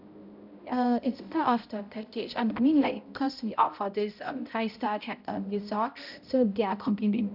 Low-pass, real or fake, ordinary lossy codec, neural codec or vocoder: 5.4 kHz; fake; none; codec, 16 kHz, 0.5 kbps, X-Codec, HuBERT features, trained on balanced general audio